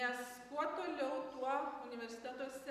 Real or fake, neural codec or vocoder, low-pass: real; none; 14.4 kHz